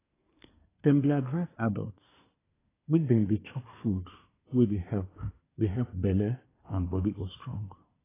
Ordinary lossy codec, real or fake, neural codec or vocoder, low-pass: AAC, 16 kbps; fake; codec, 24 kHz, 1 kbps, SNAC; 3.6 kHz